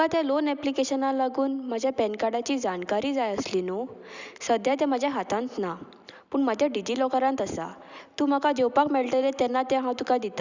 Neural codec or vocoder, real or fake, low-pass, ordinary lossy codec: none; real; 7.2 kHz; Opus, 64 kbps